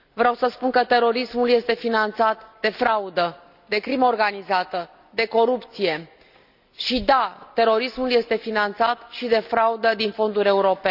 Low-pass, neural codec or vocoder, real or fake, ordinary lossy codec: 5.4 kHz; none; real; none